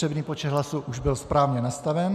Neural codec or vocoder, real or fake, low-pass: none; real; 14.4 kHz